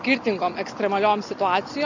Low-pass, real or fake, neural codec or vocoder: 7.2 kHz; real; none